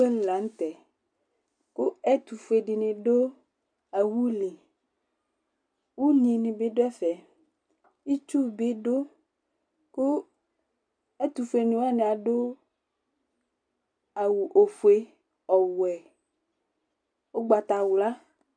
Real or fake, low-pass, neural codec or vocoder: real; 9.9 kHz; none